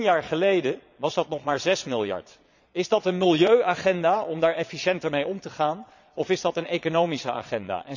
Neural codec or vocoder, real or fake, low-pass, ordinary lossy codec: vocoder, 22.05 kHz, 80 mel bands, Vocos; fake; 7.2 kHz; none